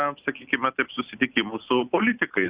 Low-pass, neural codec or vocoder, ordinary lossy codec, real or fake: 3.6 kHz; none; Opus, 64 kbps; real